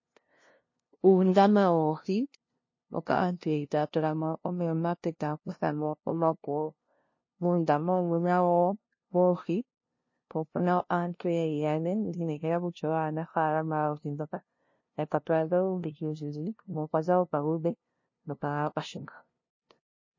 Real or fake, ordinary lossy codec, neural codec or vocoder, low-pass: fake; MP3, 32 kbps; codec, 16 kHz, 0.5 kbps, FunCodec, trained on LibriTTS, 25 frames a second; 7.2 kHz